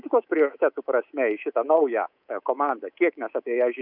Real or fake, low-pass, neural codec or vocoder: fake; 5.4 kHz; codec, 24 kHz, 3.1 kbps, DualCodec